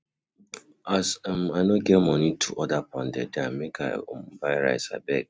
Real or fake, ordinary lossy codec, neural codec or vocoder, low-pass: real; none; none; none